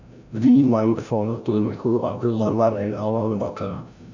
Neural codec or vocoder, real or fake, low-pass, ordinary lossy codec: codec, 16 kHz, 0.5 kbps, FreqCodec, larger model; fake; 7.2 kHz; none